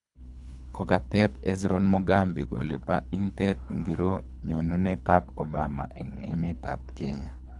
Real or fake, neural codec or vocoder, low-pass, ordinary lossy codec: fake; codec, 24 kHz, 3 kbps, HILCodec; 10.8 kHz; none